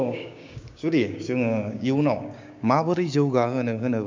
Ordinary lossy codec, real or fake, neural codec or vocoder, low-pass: MP3, 64 kbps; fake; codec, 16 kHz, 6 kbps, DAC; 7.2 kHz